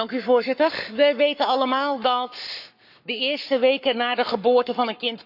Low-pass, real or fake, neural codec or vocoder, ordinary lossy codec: 5.4 kHz; fake; codec, 44.1 kHz, 7.8 kbps, Pupu-Codec; none